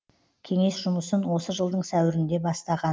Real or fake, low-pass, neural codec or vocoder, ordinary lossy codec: real; none; none; none